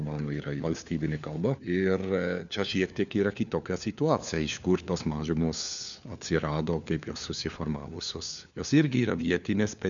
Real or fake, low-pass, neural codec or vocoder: fake; 7.2 kHz; codec, 16 kHz, 4 kbps, FunCodec, trained on LibriTTS, 50 frames a second